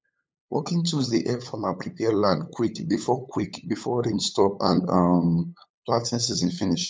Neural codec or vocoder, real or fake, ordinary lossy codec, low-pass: codec, 16 kHz, 8 kbps, FunCodec, trained on LibriTTS, 25 frames a second; fake; none; none